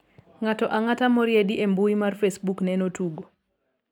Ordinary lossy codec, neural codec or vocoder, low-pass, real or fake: none; none; 19.8 kHz; real